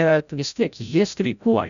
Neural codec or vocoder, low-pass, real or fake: codec, 16 kHz, 0.5 kbps, FreqCodec, larger model; 7.2 kHz; fake